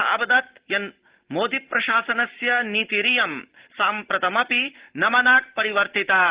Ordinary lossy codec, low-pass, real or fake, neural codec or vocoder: Opus, 16 kbps; 3.6 kHz; real; none